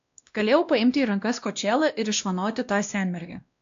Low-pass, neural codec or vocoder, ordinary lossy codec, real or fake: 7.2 kHz; codec, 16 kHz, 1 kbps, X-Codec, WavLM features, trained on Multilingual LibriSpeech; AAC, 64 kbps; fake